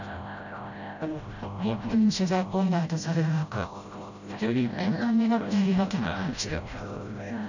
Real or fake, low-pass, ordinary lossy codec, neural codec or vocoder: fake; 7.2 kHz; none; codec, 16 kHz, 0.5 kbps, FreqCodec, smaller model